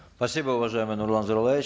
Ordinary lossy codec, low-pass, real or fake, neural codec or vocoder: none; none; real; none